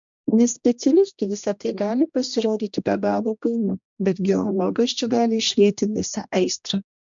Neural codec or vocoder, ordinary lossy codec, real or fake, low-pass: codec, 16 kHz, 1 kbps, X-Codec, HuBERT features, trained on general audio; MP3, 64 kbps; fake; 7.2 kHz